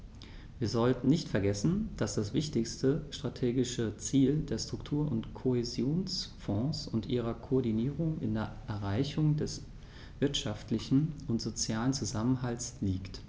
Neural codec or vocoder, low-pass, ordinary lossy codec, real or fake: none; none; none; real